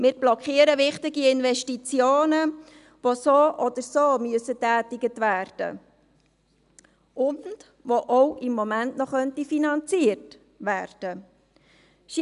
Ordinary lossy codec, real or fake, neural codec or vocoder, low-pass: none; real; none; 10.8 kHz